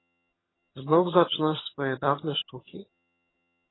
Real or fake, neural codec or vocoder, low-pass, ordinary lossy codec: fake; vocoder, 22.05 kHz, 80 mel bands, HiFi-GAN; 7.2 kHz; AAC, 16 kbps